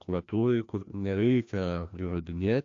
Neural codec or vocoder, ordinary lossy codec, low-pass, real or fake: codec, 16 kHz, 1 kbps, FreqCodec, larger model; AAC, 64 kbps; 7.2 kHz; fake